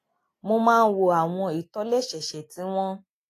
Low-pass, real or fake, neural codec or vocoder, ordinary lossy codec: 14.4 kHz; real; none; AAC, 48 kbps